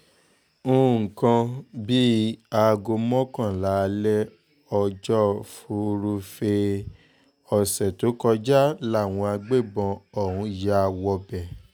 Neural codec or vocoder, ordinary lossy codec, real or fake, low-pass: none; none; real; none